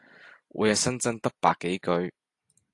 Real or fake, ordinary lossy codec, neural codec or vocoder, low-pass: real; AAC, 48 kbps; none; 10.8 kHz